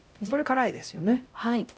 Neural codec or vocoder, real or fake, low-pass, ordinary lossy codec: codec, 16 kHz, 0.5 kbps, X-Codec, HuBERT features, trained on LibriSpeech; fake; none; none